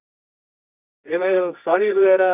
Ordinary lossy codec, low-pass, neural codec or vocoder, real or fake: none; 3.6 kHz; codec, 24 kHz, 0.9 kbps, WavTokenizer, medium music audio release; fake